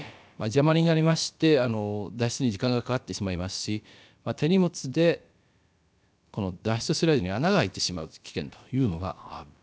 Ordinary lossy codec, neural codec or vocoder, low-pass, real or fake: none; codec, 16 kHz, about 1 kbps, DyCAST, with the encoder's durations; none; fake